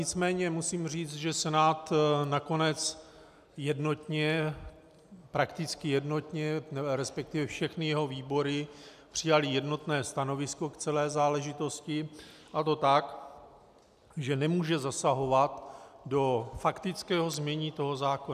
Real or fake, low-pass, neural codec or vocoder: real; 14.4 kHz; none